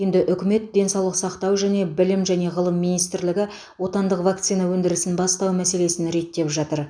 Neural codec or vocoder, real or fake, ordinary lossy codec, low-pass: none; real; none; 9.9 kHz